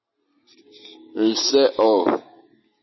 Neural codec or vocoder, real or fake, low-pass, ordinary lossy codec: none; real; 7.2 kHz; MP3, 24 kbps